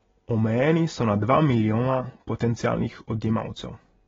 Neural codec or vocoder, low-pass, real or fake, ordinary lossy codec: none; 7.2 kHz; real; AAC, 24 kbps